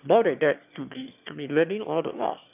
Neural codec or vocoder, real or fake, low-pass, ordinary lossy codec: autoencoder, 22.05 kHz, a latent of 192 numbers a frame, VITS, trained on one speaker; fake; 3.6 kHz; none